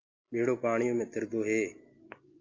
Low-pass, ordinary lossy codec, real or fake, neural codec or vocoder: 7.2 kHz; Opus, 32 kbps; real; none